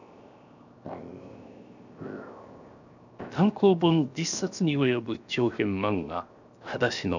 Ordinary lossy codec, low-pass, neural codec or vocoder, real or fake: none; 7.2 kHz; codec, 16 kHz, 0.7 kbps, FocalCodec; fake